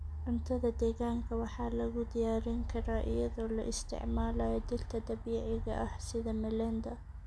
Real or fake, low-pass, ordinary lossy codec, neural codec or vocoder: real; none; none; none